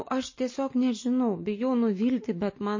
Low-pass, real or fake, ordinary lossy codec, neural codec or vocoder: 7.2 kHz; real; MP3, 32 kbps; none